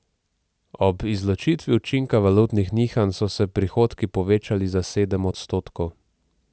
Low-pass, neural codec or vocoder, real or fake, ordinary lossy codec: none; none; real; none